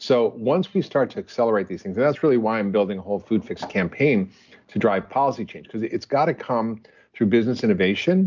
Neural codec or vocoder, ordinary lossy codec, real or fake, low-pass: none; MP3, 64 kbps; real; 7.2 kHz